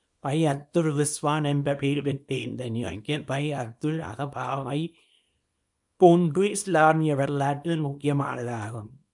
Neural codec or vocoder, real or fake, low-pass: codec, 24 kHz, 0.9 kbps, WavTokenizer, small release; fake; 10.8 kHz